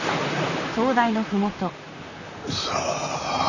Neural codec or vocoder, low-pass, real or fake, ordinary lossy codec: vocoder, 44.1 kHz, 128 mel bands, Pupu-Vocoder; 7.2 kHz; fake; none